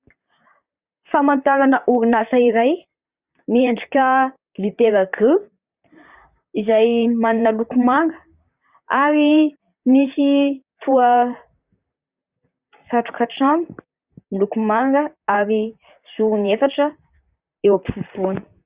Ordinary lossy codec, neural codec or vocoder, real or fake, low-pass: Opus, 64 kbps; vocoder, 44.1 kHz, 128 mel bands, Pupu-Vocoder; fake; 3.6 kHz